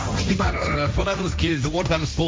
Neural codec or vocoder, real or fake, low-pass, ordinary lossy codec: codec, 16 kHz, 1.1 kbps, Voila-Tokenizer; fake; none; none